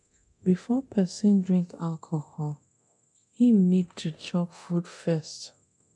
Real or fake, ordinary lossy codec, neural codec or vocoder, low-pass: fake; none; codec, 24 kHz, 0.9 kbps, DualCodec; 10.8 kHz